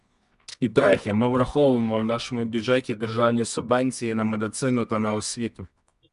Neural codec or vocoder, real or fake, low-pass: codec, 24 kHz, 0.9 kbps, WavTokenizer, medium music audio release; fake; 10.8 kHz